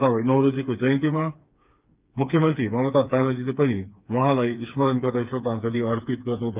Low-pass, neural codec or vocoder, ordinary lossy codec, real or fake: 3.6 kHz; codec, 16 kHz, 4 kbps, FreqCodec, smaller model; Opus, 24 kbps; fake